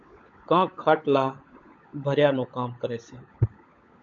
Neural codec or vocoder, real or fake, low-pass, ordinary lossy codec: codec, 16 kHz, 16 kbps, FunCodec, trained on LibriTTS, 50 frames a second; fake; 7.2 kHz; MP3, 64 kbps